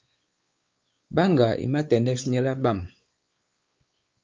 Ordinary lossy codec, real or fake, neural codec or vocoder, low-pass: Opus, 24 kbps; fake; codec, 16 kHz, 4 kbps, X-Codec, WavLM features, trained on Multilingual LibriSpeech; 7.2 kHz